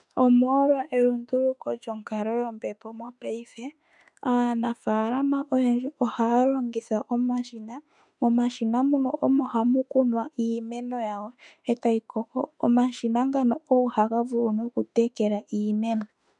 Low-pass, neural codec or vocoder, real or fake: 10.8 kHz; autoencoder, 48 kHz, 32 numbers a frame, DAC-VAE, trained on Japanese speech; fake